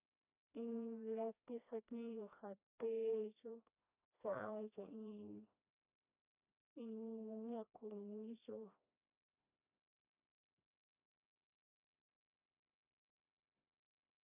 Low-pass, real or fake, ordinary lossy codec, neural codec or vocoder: 3.6 kHz; fake; none; codec, 16 kHz, 1 kbps, FreqCodec, smaller model